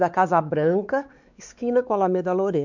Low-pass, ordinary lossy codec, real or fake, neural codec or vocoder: 7.2 kHz; none; fake; codec, 16 kHz, 4 kbps, X-Codec, WavLM features, trained on Multilingual LibriSpeech